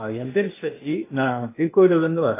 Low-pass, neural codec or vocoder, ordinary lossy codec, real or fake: 3.6 kHz; codec, 16 kHz, 0.8 kbps, ZipCodec; AAC, 24 kbps; fake